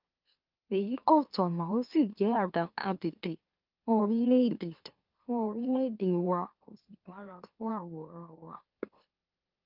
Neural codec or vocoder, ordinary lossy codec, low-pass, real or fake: autoencoder, 44.1 kHz, a latent of 192 numbers a frame, MeloTTS; Opus, 24 kbps; 5.4 kHz; fake